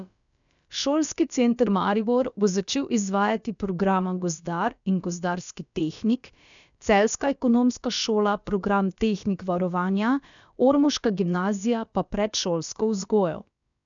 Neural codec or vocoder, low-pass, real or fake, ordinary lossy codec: codec, 16 kHz, about 1 kbps, DyCAST, with the encoder's durations; 7.2 kHz; fake; none